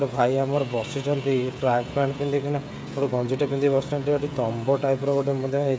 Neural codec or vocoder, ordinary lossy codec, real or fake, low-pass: codec, 16 kHz, 16 kbps, FreqCodec, smaller model; none; fake; none